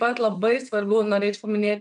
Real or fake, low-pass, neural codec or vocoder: fake; 9.9 kHz; vocoder, 22.05 kHz, 80 mel bands, WaveNeXt